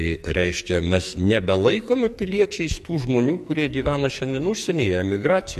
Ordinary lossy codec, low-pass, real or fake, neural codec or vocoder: MP3, 64 kbps; 14.4 kHz; fake; codec, 44.1 kHz, 2.6 kbps, SNAC